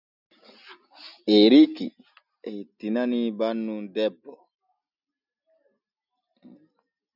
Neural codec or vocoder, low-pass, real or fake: none; 5.4 kHz; real